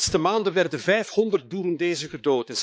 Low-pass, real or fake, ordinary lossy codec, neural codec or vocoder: none; fake; none; codec, 16 kHz, 4 kbps, X-Codec, HuBERT features, trained on balanced general audio